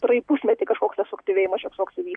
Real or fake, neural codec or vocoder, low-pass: real; none; 9.9 kHz